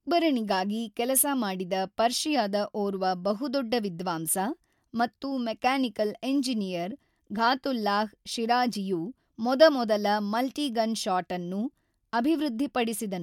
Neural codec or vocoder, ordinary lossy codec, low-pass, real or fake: none; MP3, 96 kbps; 19.8 kHz; real